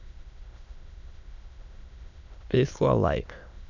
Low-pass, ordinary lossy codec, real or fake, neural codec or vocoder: 7.2 kHz; none; fake; autoencoder, 22.05 kHz, a latent of 192 numbers a frame, VITS, trained on many speakers